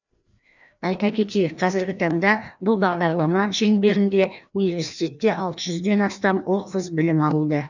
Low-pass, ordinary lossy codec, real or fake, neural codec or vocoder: 7.2 kHz; none; fake; codec, 16 kHz, 1 kbps, FreqCodec, larger model